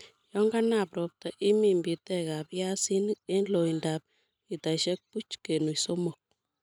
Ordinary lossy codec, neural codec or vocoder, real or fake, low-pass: none; none; real; 19.8 kHz